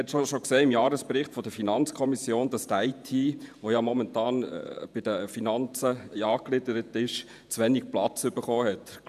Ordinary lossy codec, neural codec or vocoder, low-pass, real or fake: none; vocoder, 48 kHz, 128 mel bands, Vocos; 14.4 kHz; fake